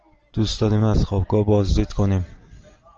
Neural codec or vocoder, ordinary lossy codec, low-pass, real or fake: none; Opus, 24 kbps; 7.2 kHz; real